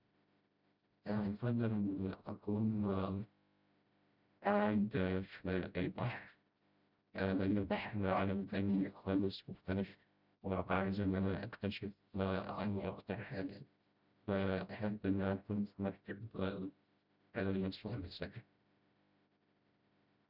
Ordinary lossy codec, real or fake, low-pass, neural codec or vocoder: Opus, 64 kbps; fake; 5.4 kHz; codec, 16 kHz, 0.5 kbps, FreqCodec, smaller model